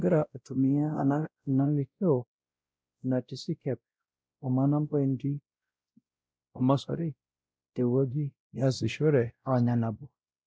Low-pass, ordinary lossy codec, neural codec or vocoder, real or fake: none; none; codec, 16 kHz, 0.5 kbps, X-Codec, WavLM features, trained on Multilingual LibriSpeech; fake